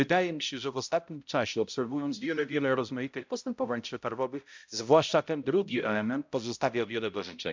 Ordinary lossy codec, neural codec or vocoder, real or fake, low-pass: MP3, 64 kbps; codec, 16 kHz, 0.5 kbps, X-Codec, HuBERT features, trained on balanced general audio; fake; 7.2 kHz